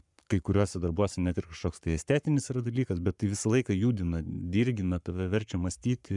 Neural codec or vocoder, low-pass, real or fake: codec, 44.1 kHz, 7.8 kbps, Pupu-Codec; 10.8 kHz; fake